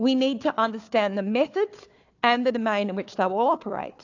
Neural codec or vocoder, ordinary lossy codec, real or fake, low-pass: codec, 16 kHz, 4 kbps, FunCodec, trained on Chinese and English, 50 frames a second; AAC, 48 kbps; fake; 7.2 kHz